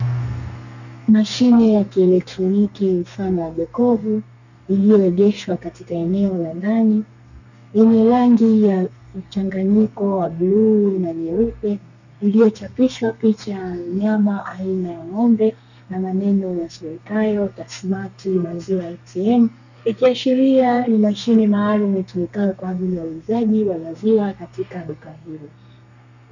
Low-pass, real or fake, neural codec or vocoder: 7.2 kHz; fake; codec, 32 kHz, 1.9 kbps, SNAC